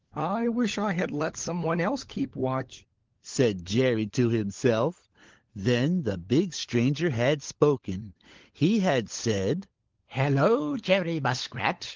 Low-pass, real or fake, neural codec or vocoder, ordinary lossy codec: 7.2 kHz; fake; codec, 16 kHz, 16 kbps, FunCodec, trained on LibriTTS, 50 frames a second; Opus, 16 kbps